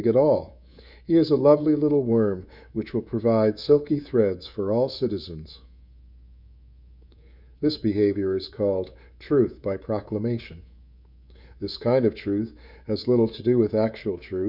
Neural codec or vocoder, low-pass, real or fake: codec, 24 kHz, 3.1 kbps, DualCodec; 5.4 kHz; fake